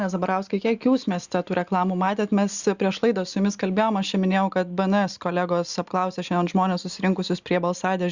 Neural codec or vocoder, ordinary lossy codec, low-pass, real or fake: none; Opus, 64 kbps; 7.2 kHz; real